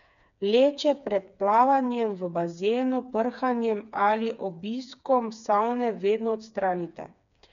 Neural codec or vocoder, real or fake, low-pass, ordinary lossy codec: codec, 16 kHz, 4 kbps, FreqCodec, smaller model; fake; 7.2 kHz; none